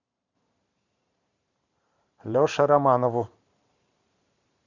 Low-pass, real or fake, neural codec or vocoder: 7.2 kHz; fake; vocoder, 44.1 kHz, 128 mel bands every 512 samples, BigVGAN v2